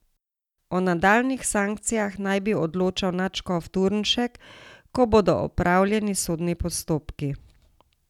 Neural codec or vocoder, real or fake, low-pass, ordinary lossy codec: none; real; 19.8 kHz; none